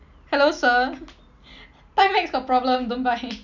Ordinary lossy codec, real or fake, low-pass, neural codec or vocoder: none; real; 7.2 kHz; none